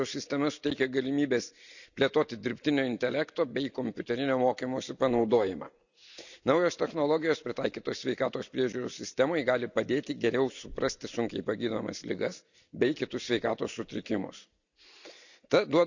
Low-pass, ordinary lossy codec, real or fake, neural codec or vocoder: 7.2 kHz; none; real; none